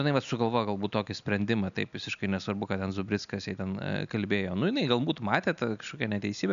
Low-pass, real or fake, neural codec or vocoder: 7.2 kHz; real; none